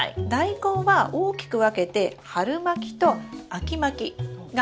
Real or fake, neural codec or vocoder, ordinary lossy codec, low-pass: real; none; none; none